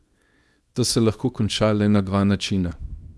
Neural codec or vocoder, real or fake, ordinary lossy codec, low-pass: codec, 24 kHz, 0.9 kbps, WavTokenizer, small release; fake; none; none